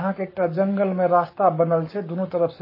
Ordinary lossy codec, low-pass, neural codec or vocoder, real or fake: MP3, 24 kbps; 5.4 kHz; codec, 44.1 kHz, 7.8 kbps, Pupu-Codec; fake